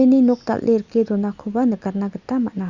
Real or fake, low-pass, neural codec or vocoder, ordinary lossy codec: real; 7.2 kHz; none; Opus, 64 kbps